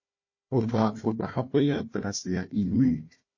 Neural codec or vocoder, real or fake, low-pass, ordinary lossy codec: codec, 16 kHz, 1 kbps, FunCodec, trained on Chinese and English, 50 frames a second; fake; 7.2 kHz; MP3, 32 kbps